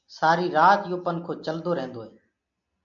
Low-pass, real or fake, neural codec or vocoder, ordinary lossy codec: 7.2 kHz; real; none; AAC, 64 kbps